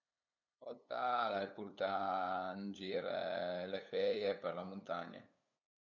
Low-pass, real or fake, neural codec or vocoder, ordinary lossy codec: 7.2 kHz; fake; codec, 16 kHz, 8 kbps, FunCodec, trained on LibriTTS, 25 frames a second; none